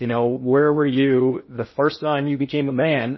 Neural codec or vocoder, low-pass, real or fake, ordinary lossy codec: codec, 16 kHz in and 24 kHz out, 0.6 kbps, FocalCodec, streaming, 2048 codes; 7.2 kHz; fake; MP3, 24 kbps